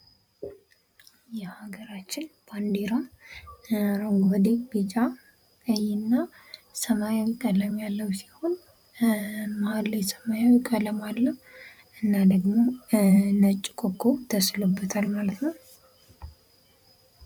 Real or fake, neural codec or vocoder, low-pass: fake; vocoder, 44.1 kHz, 128 mel bands every 512 samples, BigVGAN v2; 19.8 kHz